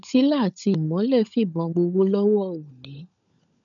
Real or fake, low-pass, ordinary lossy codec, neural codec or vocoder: fake; 7.2 kHz; none; codec, 16 kHz, 16 kbps, FunCodec, trained on LibriTTS, 50 frames a second